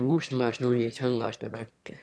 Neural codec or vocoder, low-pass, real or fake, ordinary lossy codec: autoencoder, 22.05 kHz, a latent of 192 numbers a frame, VITS, trained on one speaker; none; fake; none